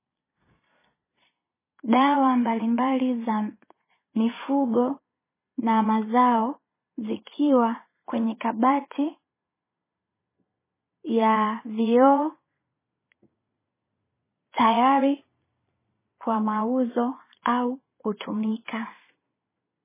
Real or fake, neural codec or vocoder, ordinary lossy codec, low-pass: fake; vocoder, 22.05 kHz, 80 mel bands, Vocos; MP3, 16 kbps; 3.6 kHz